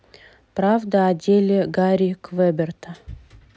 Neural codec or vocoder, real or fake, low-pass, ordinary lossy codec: none; real; none; none